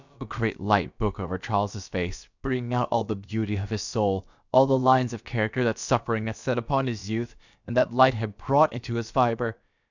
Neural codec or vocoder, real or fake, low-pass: codec, 16 kHz, about 1 kbps, DyCAST, with the encoder's durations; fake; 7.2 kHz